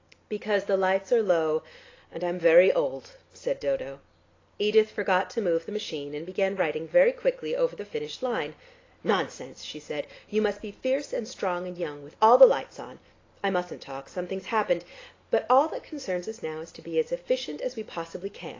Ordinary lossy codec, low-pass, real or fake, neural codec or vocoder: AAC, 32 kbps; 7.2 kHz; real; none